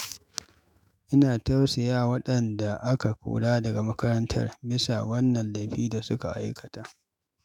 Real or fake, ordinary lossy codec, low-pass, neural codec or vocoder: fake; none; 19.8 kHz; autoencoder, 48 kHz, 128 numbers a frame, DAC-VAE, trained on Japanese speech